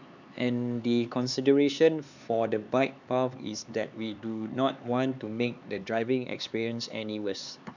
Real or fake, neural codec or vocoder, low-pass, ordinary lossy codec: fake; codec, 16 kHz, 4 kbps, X-Codec, HuBERT features, trained on LibriSpeech; 7.2 kHz; none